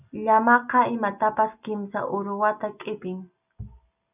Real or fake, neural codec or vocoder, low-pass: real; none; 3.6 kHz